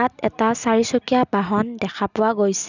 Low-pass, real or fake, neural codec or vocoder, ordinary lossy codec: 7.2 kHz; real; none; none